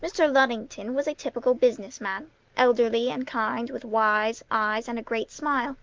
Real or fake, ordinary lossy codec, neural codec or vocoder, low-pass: real; Opus, 32 kbps; none; 7.2 kHz